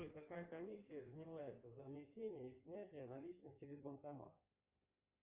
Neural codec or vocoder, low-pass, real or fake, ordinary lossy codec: codec, 16 kHz in and 24 kHz out, 1.1 kbps, FireRedTTS-2 codec; 3.6 kHz; fake; Opus, 32 kbps